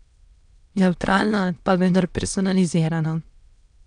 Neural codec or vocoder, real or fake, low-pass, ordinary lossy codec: autoencoder, 22.05 kHz, a latent of 192 numbers a frame, VITS, trained on many speakers; fake; 9.9 kHz; none